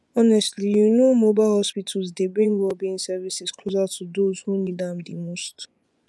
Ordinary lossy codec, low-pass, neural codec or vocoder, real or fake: none; none; vocoder, 24 kHz, 100 mel bands, Vocos; fake